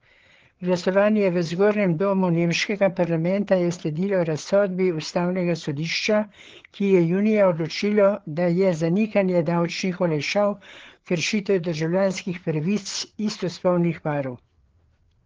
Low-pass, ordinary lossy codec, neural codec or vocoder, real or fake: 7.2 kHz; Opus, 16 kbps; codec, 16 kHz, 4 kbps, FreqCodec, larger model; fake